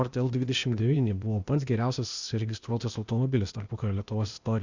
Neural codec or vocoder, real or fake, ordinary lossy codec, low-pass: codec, 16 kHz, 0.8 kbps, ZipCodec; fake; Opus, 64 kbps; 7.2 kHz